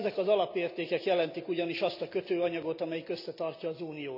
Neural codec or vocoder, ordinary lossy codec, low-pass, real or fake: none; none; 5.4 kHz; real